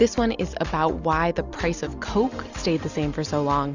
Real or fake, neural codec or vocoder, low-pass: real; none; 7.2 kHz